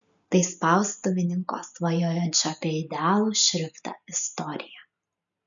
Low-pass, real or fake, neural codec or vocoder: 7.2 kHz; real; none